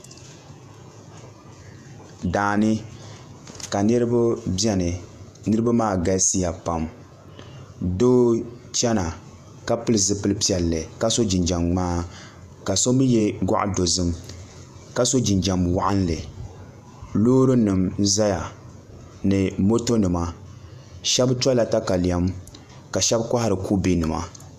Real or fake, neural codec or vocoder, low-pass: fake; vocoder, 48 kHz, 128 mel bands, Vocos; 14.4 kHz